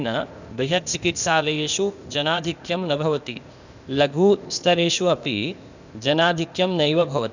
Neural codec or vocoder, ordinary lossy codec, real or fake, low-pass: codec, 16 kHz, 0.8 kbps, ZipCodec; none; fake; 7.2 kHz